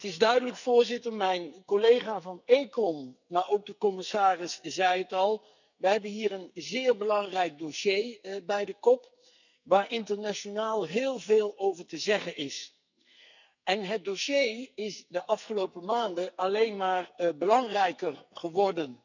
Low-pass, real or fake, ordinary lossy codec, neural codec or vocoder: 7.2 kHz; fake; none; codec, 44.1 kHz, 2.6 kbps, SNAC